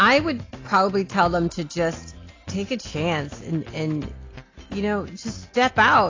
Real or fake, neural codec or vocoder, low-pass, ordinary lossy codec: real; none; 7.2 kHz; AAC, 32 kbps